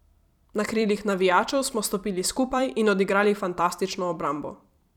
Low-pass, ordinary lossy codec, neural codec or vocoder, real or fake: 19.8 kHz; none; vocoder, 44.1 kHz, 128 mel bands every 256 samples, BigVGAN v2; fake